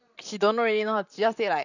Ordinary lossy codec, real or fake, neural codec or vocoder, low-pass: none; fake; vocoder, 44.1 kHz, 128 mel bands, Pupu-Vocoder; 7.2 kHz